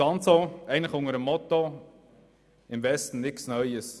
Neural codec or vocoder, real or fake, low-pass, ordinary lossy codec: none; real; none; none